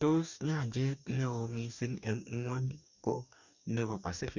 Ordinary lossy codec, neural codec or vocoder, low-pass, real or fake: none; codec, 44.1 kHz, 2.6 kbps, DAC; 7.2 kHz; fake